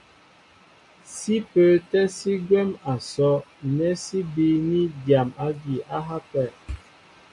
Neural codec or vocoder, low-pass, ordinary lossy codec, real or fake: none; 10.8 kHz; MP3, 96 kbps; real